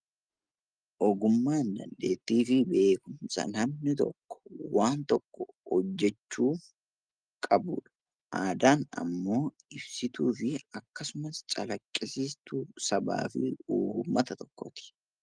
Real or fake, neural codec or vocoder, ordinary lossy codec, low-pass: real; none; Opus, 32 kbps; 9.9 kHz